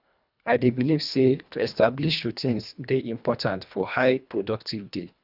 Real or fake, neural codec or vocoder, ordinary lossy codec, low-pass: fake; codec, 24 kHz, 1.5 kbps, HILCodec; none; 5.4 kHz